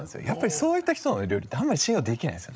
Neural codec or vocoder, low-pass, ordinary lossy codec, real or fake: codec, 16 kHz, 16 kbps, FreqCodec, larger model; none; none; fake